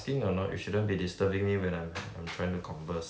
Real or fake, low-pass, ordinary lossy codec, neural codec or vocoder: real; none; none; none